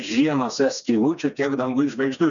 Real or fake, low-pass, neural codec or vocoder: fake; 7.2 kHz; codec, 16 kHz, 2 kbps, FreqCodec, smaller model